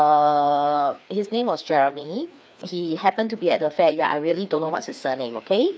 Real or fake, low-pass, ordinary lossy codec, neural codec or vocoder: fake; none; none; codec, 16 kHz, 2 kbps, FreqCodec, larger model